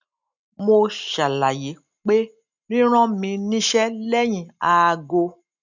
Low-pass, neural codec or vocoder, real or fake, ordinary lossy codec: 7.2 kHz; none; real; none